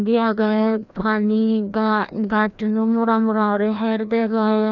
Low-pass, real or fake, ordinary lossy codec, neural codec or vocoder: 7.2 kHz; fake; none; codec, 16 kHz, 1 kbps, FreqCodec, larger model